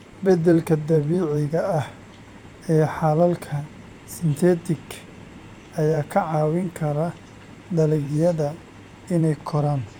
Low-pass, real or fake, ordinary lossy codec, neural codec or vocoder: 19.8 kHz; fake; none; vocoder, 44.1 kHz, 128 mel bands every 512 samples, BigVGAN v2